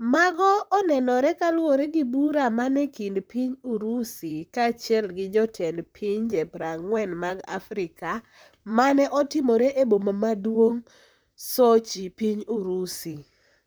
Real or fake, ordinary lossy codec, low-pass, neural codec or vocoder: fake; none; none; vocoder, 44.1 kHz, 128 mel bands, Pupu-Vocoder